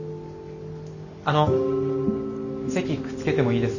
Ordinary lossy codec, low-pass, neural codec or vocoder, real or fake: none; 7.2 kHz; none; real